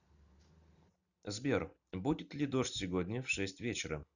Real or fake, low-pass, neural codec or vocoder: real; 7.2 kHz; none